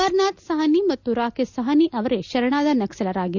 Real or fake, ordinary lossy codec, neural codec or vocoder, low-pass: real; none; none; 7.2 kHz